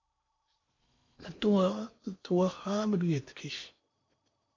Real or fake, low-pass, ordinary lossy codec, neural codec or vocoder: fake; 7.2 kHz; MP3, 48 kbps; codec, 16 kHz in and 24 kHz out, 0.8 kbps, FocalCodec, streaming, 65536 codes